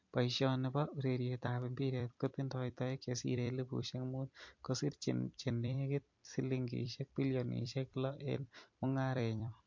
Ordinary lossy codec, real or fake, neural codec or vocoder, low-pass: MP3, 48 kbps; fake; vocoder, 44.1 kHz, 128 mel bands every 256 samples, BigVGAN v2; 7.2 kHz